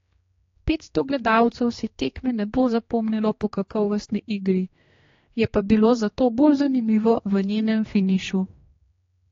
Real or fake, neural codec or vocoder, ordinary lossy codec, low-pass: fake; codec, 16 kHz, 2 kbps, X-Codec, HuBERT features, trained on general audio; AAC, 32 kbps; 7.2 kHz